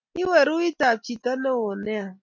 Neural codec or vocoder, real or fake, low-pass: none; real; 7.2 kHz